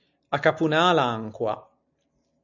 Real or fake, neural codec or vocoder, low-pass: real; none; 7.2 kHz